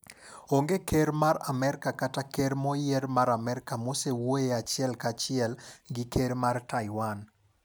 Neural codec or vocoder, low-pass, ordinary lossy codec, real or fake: none; none; none; real